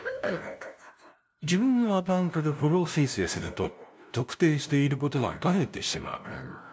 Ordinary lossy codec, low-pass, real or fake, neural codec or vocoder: none; none; fake; codec, 16 kHz, 0.5 kbps, FunCodec, trained on LibriTTS, 25 frames a second